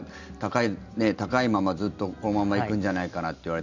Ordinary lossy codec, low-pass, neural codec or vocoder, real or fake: none; 7.2 kHz; none; real